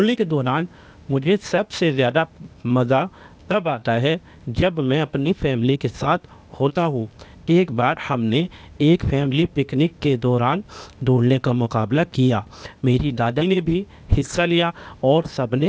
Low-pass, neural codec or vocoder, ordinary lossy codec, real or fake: none; codec, 16 kHz, 0.8 kbps, ZipCodec; none; fake